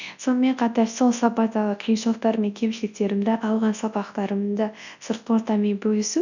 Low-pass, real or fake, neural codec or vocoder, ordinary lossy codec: 7.2 kHz; fake; codec, 24 kHz, 0.9 kbps, WavTokenizer, large speech release; none